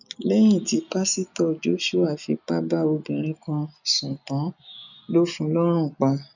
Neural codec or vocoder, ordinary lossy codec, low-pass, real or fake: none; none; 7.2 kHz; real